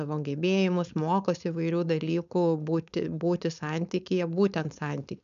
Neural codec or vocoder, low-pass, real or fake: codec, 16 kHz, 4.8 kbps, FACodec; 7.2 kHz; fake